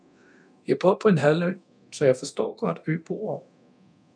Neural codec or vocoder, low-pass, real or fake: codec, 24 kHz, 0.9 kbps, DualCodec; 9.9 kHz; fake